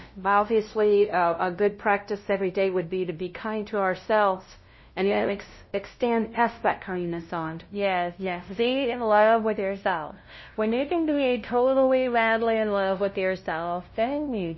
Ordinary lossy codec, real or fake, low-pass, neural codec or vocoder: MP3, 24 kbps; fake; 7.2 kHz; codec, 16 kHz, 0.5 kbps, FunCodec, trained on LibriTTS, 25 frames a second